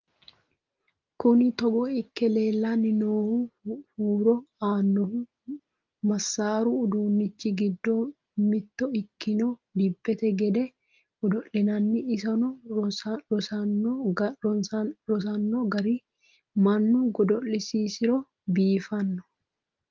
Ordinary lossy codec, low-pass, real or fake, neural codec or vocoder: Opus, 32 kbps; 7.2 kHz; real; none